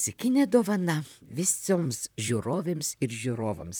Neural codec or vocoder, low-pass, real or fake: vocoder, 44.1 kHz, 128 mel bands, Pupu-Vocoder; 19.8 kHz; fake